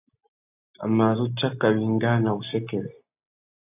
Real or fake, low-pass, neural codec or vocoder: real; 3.6 kHz; none